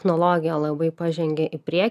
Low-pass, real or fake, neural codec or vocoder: 14.4 kHz; real; none